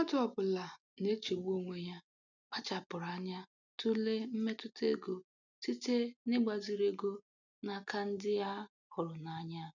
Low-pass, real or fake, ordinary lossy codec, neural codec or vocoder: 7.2 kHz; real; none; none